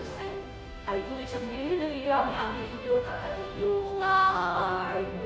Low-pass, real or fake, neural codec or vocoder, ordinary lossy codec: none; fake; codec, 16 kHz, 0.5 kbps, FunCodec, trained on Chinese and English, 25 frames a second; none